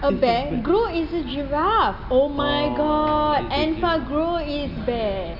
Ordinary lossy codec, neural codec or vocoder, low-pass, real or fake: none; none; 5.4 kHz; real